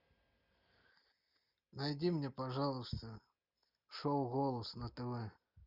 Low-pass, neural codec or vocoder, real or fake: 5.4 kHz; none; real